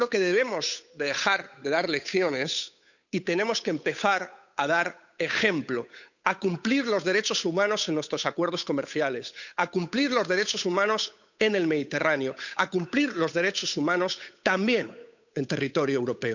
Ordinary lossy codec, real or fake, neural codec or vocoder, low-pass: none; fake; codec, 16 kHz, 8 kbps, FunCodec, trained on Chinese and English, 25 frames a second; 7.2 kHz